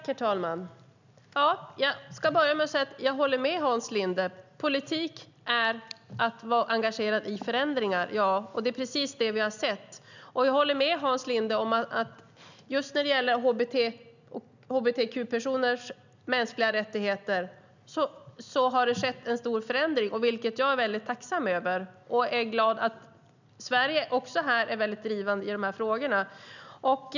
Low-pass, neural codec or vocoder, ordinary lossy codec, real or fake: 7.2 kHz; none; none; real